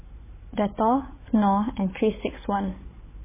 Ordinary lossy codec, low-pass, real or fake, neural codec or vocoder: MP3, 16 kbps; 3.6 kHz; fake; codec, 16 kHz, 16 kbps, FunCodec, trained on Chinese and English, 50 frames a second